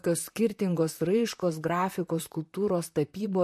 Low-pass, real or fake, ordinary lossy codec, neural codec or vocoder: 14.4 kHz; fake; MP3, 64 kbps; vocoder, 44.1 kHz, 128 mel bands, Pupu-Vocoder